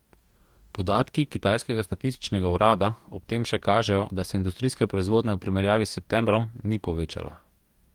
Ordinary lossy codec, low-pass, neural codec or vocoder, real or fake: Opus, 32 kbps; 19.8 kHz; codec, 44.1 kHz, 2.6 kbps, DAC; fake